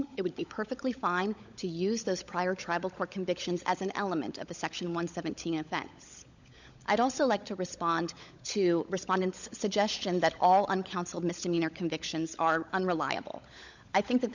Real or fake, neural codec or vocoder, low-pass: fake; codec, 16 kHz, 16 kbps, FunCodec, trained on LibriTTS, 50 frames a second; 7.2 kHz